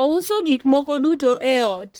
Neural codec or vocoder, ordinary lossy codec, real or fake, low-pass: codec, 44.1 kHz, 1.7 kbps, Pupu-Codec; none; fake; none